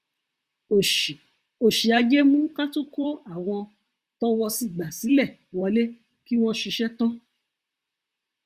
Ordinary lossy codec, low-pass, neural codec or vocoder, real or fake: Opus, 64 kbps; 14.4 kHz; vocoder, 44.1 kHz, 128 mel bands, Pupu-Vocoder; fake